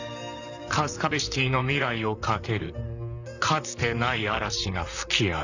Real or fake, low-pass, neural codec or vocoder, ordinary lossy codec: fake; 7.2 kHz; vocoder, 44.1 kHz, 128 mel bands, Pupu-Vocoder; none